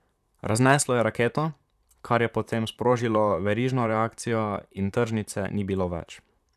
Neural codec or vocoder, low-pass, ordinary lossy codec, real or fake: vocoder, 44.1 kHz, 128 mel bands, Pupu-Vocoder; 14.4 kHz; none; fake